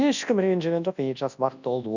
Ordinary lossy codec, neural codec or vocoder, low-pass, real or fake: none; codec, 24 kHz, 0.9 kbps, WavTokenizer, large speech release; 7.2 kHz; fake